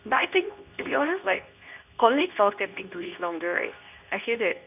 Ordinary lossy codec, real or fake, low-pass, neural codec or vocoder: none; fake; 3.6 kHz; codec, 24 kHz, 0.9 kbps, WavTokenizer, medium speech release version 2